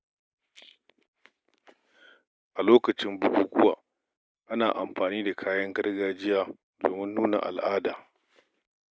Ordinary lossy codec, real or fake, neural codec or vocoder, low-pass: none; real; none; none